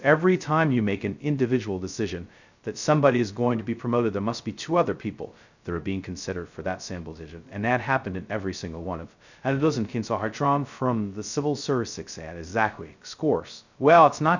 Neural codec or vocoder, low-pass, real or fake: codec, 16 kHz, 0.2 kbps, FocalCodec; 7.2 kHz; fake